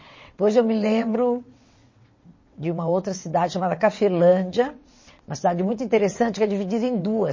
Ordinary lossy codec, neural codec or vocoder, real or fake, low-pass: MP3, 32 kbps; vocoder, 22.05 kHz, 80 mel bands, WaveNeXt; fake; 7.2 kHz